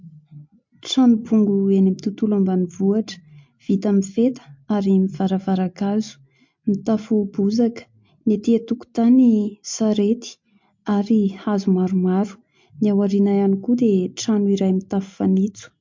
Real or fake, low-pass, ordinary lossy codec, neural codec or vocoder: real; 7.2 kHz; MP3, 48 kbps; none